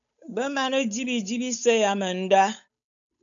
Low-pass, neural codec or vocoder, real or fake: 7.2 kHz; codec, 16 kHz, 8 kbps, FunCodec, trained on Chinese and English, 25 frames a second; fake